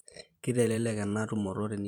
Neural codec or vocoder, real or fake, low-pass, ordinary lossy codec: vocoder, 44.1 kHz, 128 mel bands every 512 samples, BigVGAN v2; fake; 19.8 kHz; none